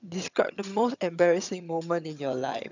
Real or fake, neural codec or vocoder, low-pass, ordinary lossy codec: fake; vocoder, 22.05 kHz, 80 mel bands, HiFi-GAN; 7.2 kHz; none